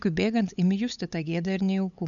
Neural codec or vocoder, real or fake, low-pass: codec, 16 kHz, 8 kbps, FunCodec, trained on LibriTTS, 25 frames a second; fake; 7.2 kHz